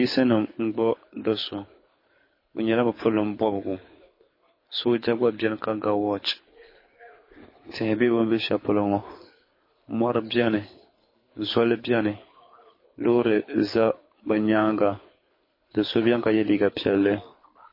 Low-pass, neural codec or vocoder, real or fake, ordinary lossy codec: 5.4 kHz; codec, 24 kHz, 6 kbps, HILCodec; fake; MP3, 24 kbps